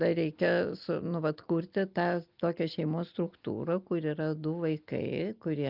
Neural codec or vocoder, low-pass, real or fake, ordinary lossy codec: none; 5.4 kHz; real; Opus, 24 kbps